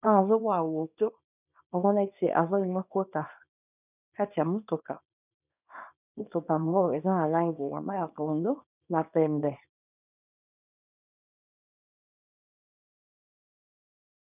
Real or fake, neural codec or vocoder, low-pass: fake; codec, 24 kHz, 0.9 kbps, WavTokenizer, small release; 3.6 kHz